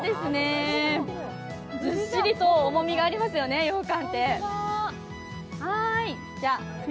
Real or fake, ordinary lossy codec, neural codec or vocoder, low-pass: real; none; none; none